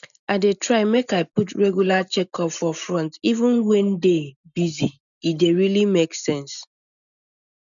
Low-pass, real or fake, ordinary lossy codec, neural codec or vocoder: 7.2 kHz; real; none; none